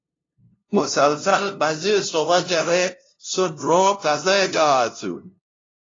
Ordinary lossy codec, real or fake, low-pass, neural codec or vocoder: AAC, 32 kbps; fake; 7.2 kHz; codec, 16 kHz, 0.5 kbps, FunCodec, trained on LibriTTS, 25 frames a second